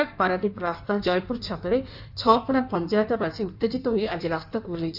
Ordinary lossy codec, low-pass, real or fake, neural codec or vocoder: none; 5.4 kHz; fake; codec, 16 kHz in and 24 kHz out, 1.1 kbps, FireRedTTS-2 codec